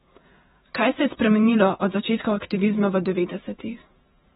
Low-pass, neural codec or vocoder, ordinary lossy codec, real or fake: 19.8 kHz; vocoder, 48 kHz, 128 mel bands, Vocos; AAC, 16 kbps; fake